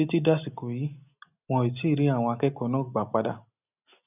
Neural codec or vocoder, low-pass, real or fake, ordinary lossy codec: none; 3.6 kHz; real; none